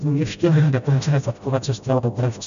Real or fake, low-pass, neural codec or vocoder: fake; 7.2 kHz; codec, 16 kHz, 0.5 kbps, FreqCodec, smaller model